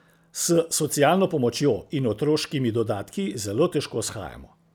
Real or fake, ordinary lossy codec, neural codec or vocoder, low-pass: real; none; none; none